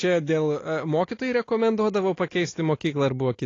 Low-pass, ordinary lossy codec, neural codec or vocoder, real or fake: 7.2 kHz; AAC, 32 kbps; none; real